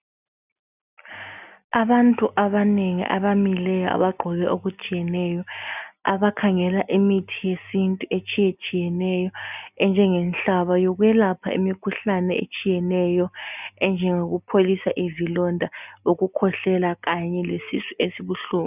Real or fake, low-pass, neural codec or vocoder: real; 3.6 kHz; none